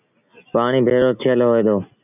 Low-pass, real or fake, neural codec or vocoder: 3.6 kHz; real; none